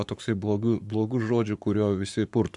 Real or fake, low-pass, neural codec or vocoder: fake; 10.8 kHz; codec, 44.1 kHz, 7.8 kbps, Pupu-Codec